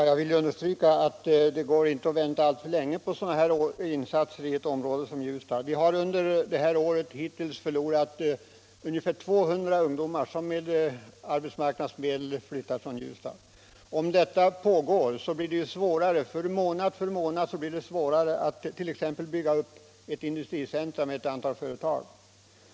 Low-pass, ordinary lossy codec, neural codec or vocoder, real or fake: none; none; none; real